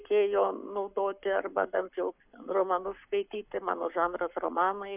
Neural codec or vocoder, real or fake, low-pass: codec, 44.1 kHz, 7.8 kbps, Pupu-Codec; fake; 3.6 kHz